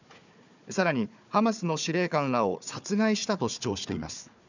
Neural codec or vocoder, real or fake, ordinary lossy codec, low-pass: codec, 16 kHz, 4 kbps, FunCodec, trained on Chinese and English, 50 frames a second; fake; none; 7.2 kHz